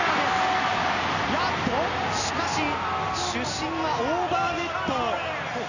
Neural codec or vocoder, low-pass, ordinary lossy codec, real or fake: none; 7.2 kHz; none; real